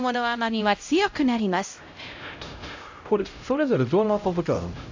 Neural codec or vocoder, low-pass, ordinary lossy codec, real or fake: codec, 16 kHz, 0.5 kbps, X-Codec, HuBERT features, trained on LibriSpeech; 7.2 kHz; AAC, 48 kbps; fake